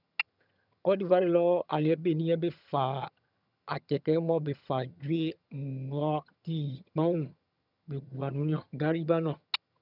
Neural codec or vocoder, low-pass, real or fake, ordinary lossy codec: vocoder, 22.05 kHz, 80 mel bands, HiFi-GAN; 5.4 kHz; fake; none